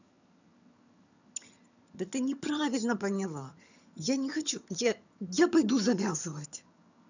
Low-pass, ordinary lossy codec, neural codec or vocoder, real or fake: 7.2 kHz; none; vocoder, 22.05 kHz, 80 mel bands, HiFi-GAN; fake